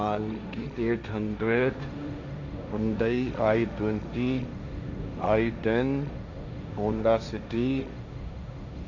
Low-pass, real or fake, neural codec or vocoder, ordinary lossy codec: 7.2 kHz; fake; codec, 16 kHz, 1.1 kbps, Voila-Tokenizer; none